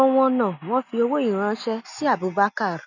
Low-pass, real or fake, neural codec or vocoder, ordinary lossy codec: 7.2 kHz; real; none; none